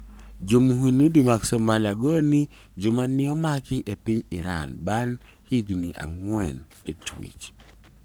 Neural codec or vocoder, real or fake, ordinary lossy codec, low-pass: codec, 44.1 kHz, 3.4 kbps, Pupu-Codec; fake; none; none